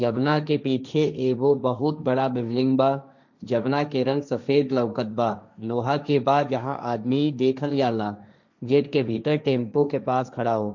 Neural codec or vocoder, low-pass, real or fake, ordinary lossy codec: codec, 16 kHz, 1.1 kbps, Voila-Tokenizer; 7.2 kHz; fake; none